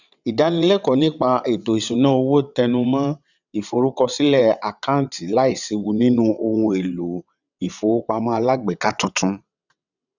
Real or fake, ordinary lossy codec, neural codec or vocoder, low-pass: fake; none; vocoder, 22.05 kHz, 80 mel bands, WaveNeXt; 7.2 kHz